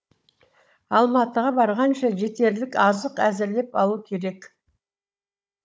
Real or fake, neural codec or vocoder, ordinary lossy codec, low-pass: fake; codec, 16 kHz, 16 kbps, FunCodec, trained on Chinese and English, 50 frames a second; none; none